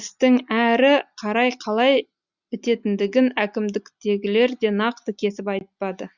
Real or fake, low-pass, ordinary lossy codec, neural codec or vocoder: real; 7.2 kHz; Opus, 64 kbps; none